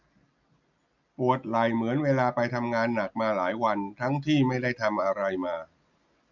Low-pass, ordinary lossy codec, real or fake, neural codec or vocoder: 7.2 kHz; none; real; none